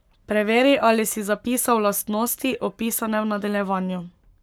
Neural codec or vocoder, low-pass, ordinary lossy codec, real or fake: codec, 44.1 kHz, 7.8 kbps, Pupu-Codec; none; none; fake